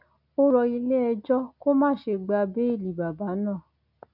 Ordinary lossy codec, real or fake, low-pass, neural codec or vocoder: none; real; 5.4 kHz; none